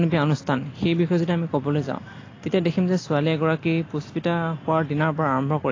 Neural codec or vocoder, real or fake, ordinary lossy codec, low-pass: none; real; AAC, 32 kbps; 7.2 kHz